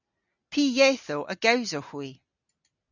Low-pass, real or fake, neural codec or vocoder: 7.2 kHz; real; none